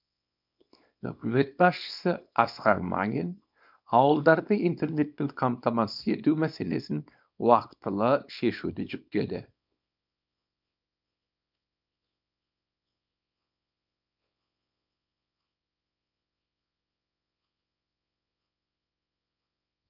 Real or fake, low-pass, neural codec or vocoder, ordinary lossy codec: fake; 5.4 kHz; codec, 24 kHz, 0.9 kbps, WavTokenizer, small release; none